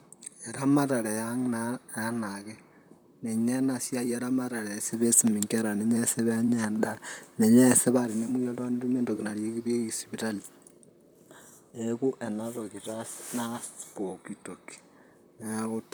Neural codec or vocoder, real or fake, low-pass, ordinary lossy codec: vocoder, 44.1 kHz, 128 mel bands, Pupu-Vocoder; fake; none; none